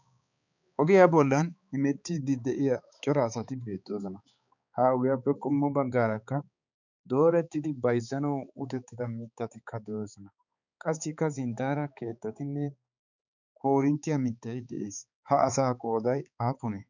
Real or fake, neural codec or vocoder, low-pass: fake; codec, 16 kHz, 4 kbps, X-Codec, HuBERT features, trained on balanced general audio; 7.2 kHz